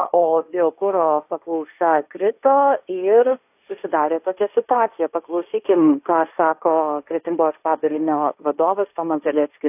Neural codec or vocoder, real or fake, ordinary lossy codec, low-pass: codec, 16 kHz, 1.1 kbps, Voila-Tokenizer; fake; AAC, 32 kbps; 3.6 kHz